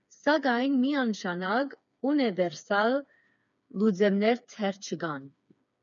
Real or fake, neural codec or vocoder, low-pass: fake; codec, 16 kHz, 4 kbps, FreqCodec, smaller model; 7.2 kHz